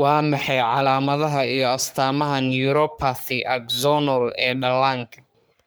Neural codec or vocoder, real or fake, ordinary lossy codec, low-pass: codec, 44.1 kHz, 3.4 kbps, Pupu-Codec; fake; none; none